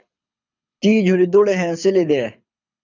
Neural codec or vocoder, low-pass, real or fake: codec, 24 kHz, 6 kbps, HILCodec; 7.2 kHz; fake